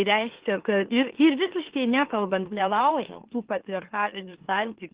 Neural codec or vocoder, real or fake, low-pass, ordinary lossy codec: autoencoder, 44.1 kHz, a latent of 192 numbers a frame, MeloTTS; fake; 3.6 kHz; Opus, 16 kbps